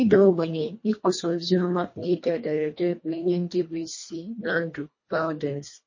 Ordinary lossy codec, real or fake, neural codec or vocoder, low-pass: MP3, 32 kbps; fake; codec, 24 kHz, 1.5 kbps, HILCodec; 7.2 kHz